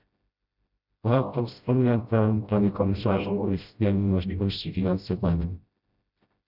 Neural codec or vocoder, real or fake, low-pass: codec, 16 kHz, 0.5 kbps, FreqCodec, smaller model; fake; 5.4 kHz